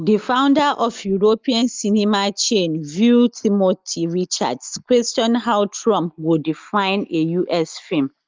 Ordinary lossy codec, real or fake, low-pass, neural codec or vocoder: Opus, 32 kbps; real; 7.2 kHz; none